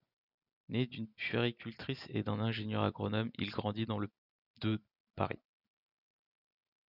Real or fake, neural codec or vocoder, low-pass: real; none; 5.4 kHz